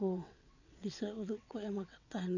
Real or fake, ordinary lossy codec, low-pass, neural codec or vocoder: fake; none; 7.2 kHz; vocoder, 22.05 kHz, 80 mel bands, WaveNeXt